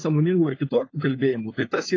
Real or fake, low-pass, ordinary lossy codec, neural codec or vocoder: fake; 7.2 kHz; AAC, 32 kbps; codec, 16 kHz, 4 kbps, FunCodec, trained on LibriTTS, 50 frames a second